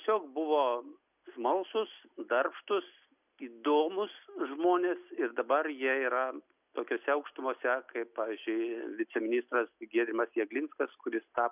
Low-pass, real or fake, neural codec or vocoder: 3.6 kHz; real; none